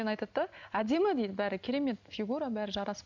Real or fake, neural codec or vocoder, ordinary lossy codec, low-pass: real; none; none; 7.2 kHz